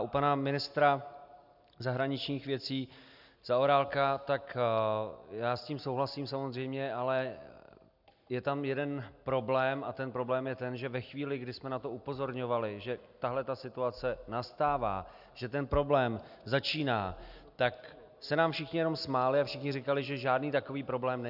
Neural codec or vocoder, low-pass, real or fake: none; 5.4 kHz; real